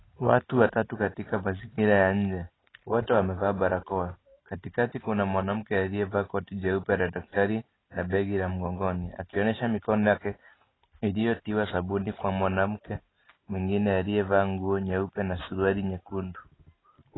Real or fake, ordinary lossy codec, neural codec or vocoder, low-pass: real; AAC, 16 kbps; none; 7.2 kHz